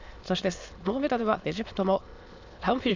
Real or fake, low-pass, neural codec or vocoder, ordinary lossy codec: fake; 7.2 kHz; autoencoder, 22.05 kHz, a latent of 192 numbers a frame, VITS, trained on many speakers; MP3, 64 kbps